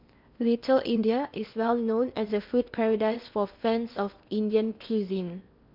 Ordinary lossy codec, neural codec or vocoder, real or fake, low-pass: MP3, 48 kbps; codec, 16 kHz in and 24 kHz out, 0.8 kbps, FocalCodec, streaming, 65536 codes; fake; 5.4 kHz